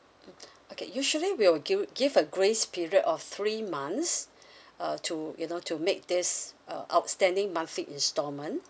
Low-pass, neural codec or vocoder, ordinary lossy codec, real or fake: none; none; none; real